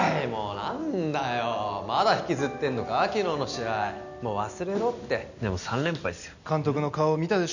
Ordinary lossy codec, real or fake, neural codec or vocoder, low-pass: none; real; none; 7.2 kHz